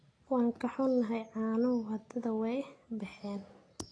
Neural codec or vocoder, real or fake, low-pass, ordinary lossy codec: none; real; 9.9 kHz; AAC, 32 kbps